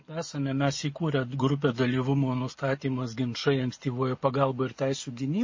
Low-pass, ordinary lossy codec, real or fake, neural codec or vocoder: 7.2 kHz; MP3, 32 kbps; real; none